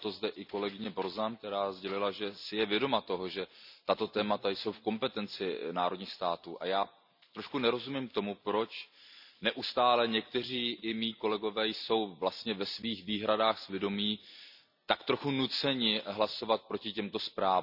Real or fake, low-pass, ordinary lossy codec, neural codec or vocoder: real; 5.4 kHz; none; none